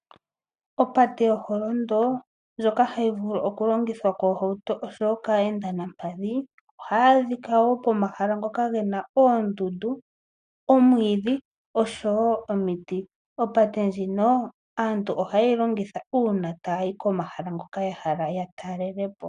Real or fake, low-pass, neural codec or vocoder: real; 9.9 kHz; none